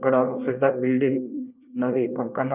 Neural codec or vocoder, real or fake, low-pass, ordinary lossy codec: codec, 24 kHz, 1 kbps, SNAC; fake; 3.6 kHz; none